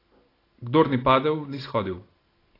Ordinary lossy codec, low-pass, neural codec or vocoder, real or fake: AAC, 24 kbps; 5.4 kHz; none; real